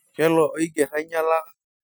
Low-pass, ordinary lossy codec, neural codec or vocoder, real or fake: none; none; none; real